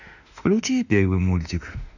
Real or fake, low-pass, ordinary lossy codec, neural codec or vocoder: fake; 7.2 kHz; none; autoencoder, 48 kHz, 32 numbers a frame, DAC-VAE, trained on Japanese speech